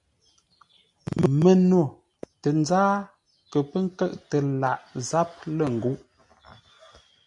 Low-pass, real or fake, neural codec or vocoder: 10.8 kHz; real; none